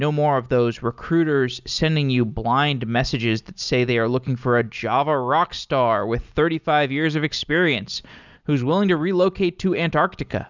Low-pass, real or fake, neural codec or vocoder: 7.2 kHz; real; none